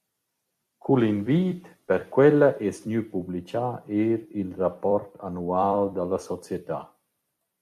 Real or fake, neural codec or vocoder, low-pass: fake; vocoder, 44.1 kHz, 128 mel bands every 256 samples, BigVGAN v2; 14.4 kHz